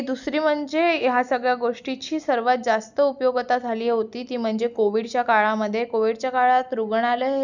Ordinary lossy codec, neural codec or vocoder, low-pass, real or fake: none; none; 7.2 kHz; real